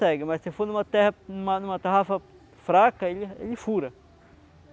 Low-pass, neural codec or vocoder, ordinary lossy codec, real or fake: none; none; none; real